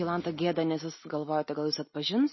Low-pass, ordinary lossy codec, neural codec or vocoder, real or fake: 7.2 kHz; MP3, 24 kbps; none; real